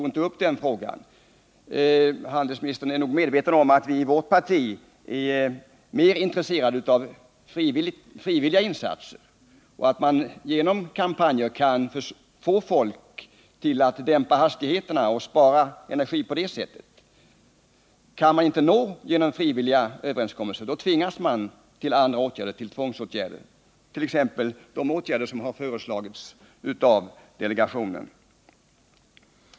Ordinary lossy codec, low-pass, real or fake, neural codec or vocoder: none; none; real; none